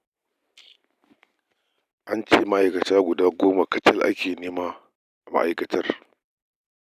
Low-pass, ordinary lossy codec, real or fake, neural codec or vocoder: 14.4 kHz; none; real; none